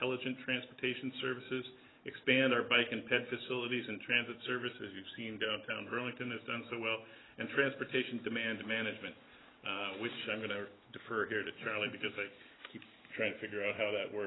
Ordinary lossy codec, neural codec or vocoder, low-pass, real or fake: AAC, 16 kbps; none; 7.2 kHz; real